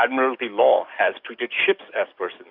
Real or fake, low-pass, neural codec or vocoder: fake; 5.4 kHz; codec, 44.1 kHz, 7.8 kbps, DAC